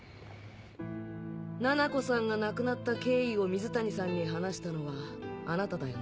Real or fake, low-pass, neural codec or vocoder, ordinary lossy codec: real; none; none; none